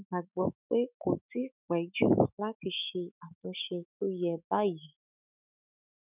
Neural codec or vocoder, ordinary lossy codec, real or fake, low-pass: autoencoder, 48 kHz, 128 numbers a frame, DAC-VAE, trained on Japanese speech; none; fake; 3.6 kHz